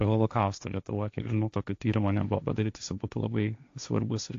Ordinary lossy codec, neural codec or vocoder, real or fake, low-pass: AAC, 48 kbps; codec, 16 kHz, 1.1 kbps, Voila-Tokenizer; fake; 7.2 kHz